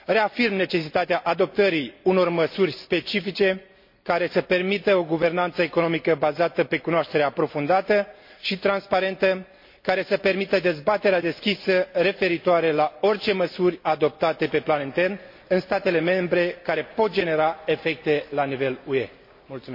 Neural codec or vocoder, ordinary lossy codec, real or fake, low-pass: none; MP3, 32 kbps; real; 5.4 kHz